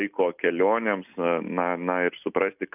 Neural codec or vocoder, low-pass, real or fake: none; 3.6 kHz; real